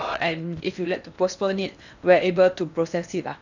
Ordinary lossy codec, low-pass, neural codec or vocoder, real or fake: none; 7.2 kHz; codec, 16 kHz in and 24 kHz out, 0.8 kbps, FocalCodec, streaming, 65536 codes; fake